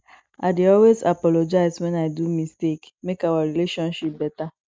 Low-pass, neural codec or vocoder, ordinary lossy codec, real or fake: none; none; none; real